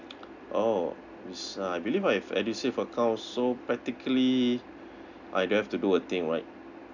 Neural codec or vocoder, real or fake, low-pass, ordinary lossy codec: none; real; 7.2 kHz; none